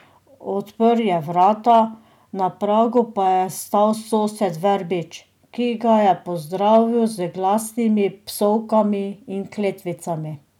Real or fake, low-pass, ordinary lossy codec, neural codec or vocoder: real; 19.8 kHz; none; none